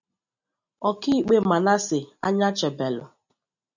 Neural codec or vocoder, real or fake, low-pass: none; real; 7.2 kHz